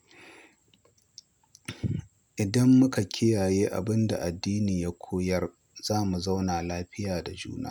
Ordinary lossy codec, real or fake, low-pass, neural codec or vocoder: none; real; none; none